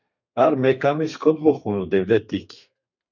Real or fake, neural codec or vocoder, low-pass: fake; codec, 32 kHz, 1.9 kbps, SNAC; 7.2 kHz